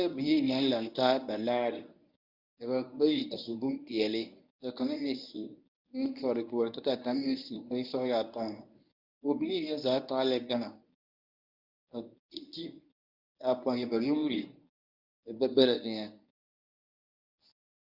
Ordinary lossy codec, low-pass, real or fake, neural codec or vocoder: Opus, 64 kbps; 5.4 kHz; fake; codec, 24 kHz, 0.9 kbps, WavTokenizer, medium speech release version 1